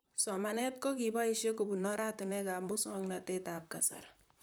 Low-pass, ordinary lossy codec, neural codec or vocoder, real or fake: none; none; vocoder, 44.1 kHz, 128 mel bands, Pupu-Vocoder; fake